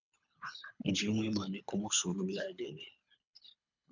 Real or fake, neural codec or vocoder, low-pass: fake; codec, 24 kHz, 3 kbps, HILCodec; 7.2 kHz